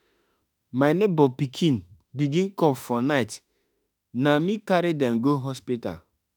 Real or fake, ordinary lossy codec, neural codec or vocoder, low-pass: fake; none; autoencoder, 48 kHz, 32 numbers a frame, DAC-VAE, trained on Japanese speech; none